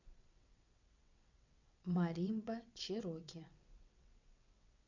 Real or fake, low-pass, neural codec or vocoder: fake; 7.2 kHz; vocoder, 22.05 kHz, 80 mel bands, WaveNeXt